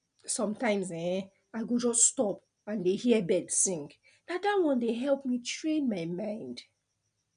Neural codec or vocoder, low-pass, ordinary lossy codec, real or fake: none; 9.9 kHz; none; real